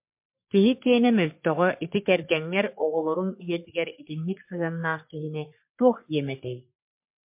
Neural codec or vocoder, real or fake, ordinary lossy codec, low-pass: codec, 44.1 kHz, 3.4 kbps, Pupu-Codec; fake; MP3, 32 kbps; 3.6 kHz